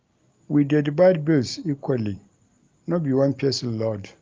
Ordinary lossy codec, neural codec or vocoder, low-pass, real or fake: Opus, 24 kbps; none; 7.2 kHz; real